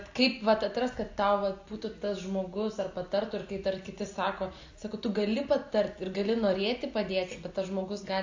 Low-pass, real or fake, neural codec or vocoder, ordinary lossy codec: 7.2 kHz; real; none; AAC, 48 kbps